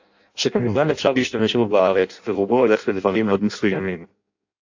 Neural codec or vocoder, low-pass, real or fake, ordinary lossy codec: codec, 16 kHz in and 24 kHz out, 0.6 kbps, FireRedTTS-2 codec; 7.2 kHz; fake; AAC, 48 kbps